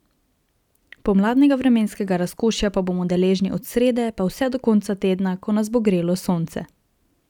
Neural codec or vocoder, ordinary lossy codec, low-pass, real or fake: none; none; 19.8 kHz; real